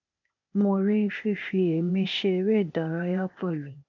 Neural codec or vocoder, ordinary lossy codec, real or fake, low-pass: codec, 16 kHz, 0.8 kbps, ZipCodec; none; fake; 7.2 kHz